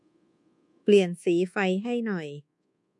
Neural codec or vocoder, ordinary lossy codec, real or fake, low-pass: codec, 24 kHz, 1.2 kbps, DualCodec; MP3, 64 kbps; fake; 10.8 kHz